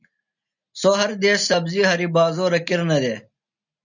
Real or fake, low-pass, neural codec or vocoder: real; 7.2 kHz; none